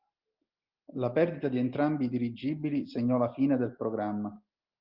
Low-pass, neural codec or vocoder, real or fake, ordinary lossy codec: 5.4 kHz; none; real; Opus, 32 kbps